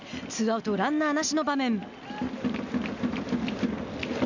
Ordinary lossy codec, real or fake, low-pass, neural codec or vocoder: none; real; 7.2 kHz; none